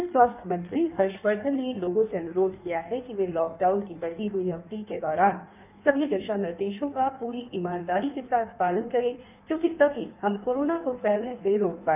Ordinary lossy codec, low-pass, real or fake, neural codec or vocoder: none; 3.6 kHz; fake; codec, 16 kHz in and 24 kHz out, 1.1 kbps, FireRedTTS-2 codec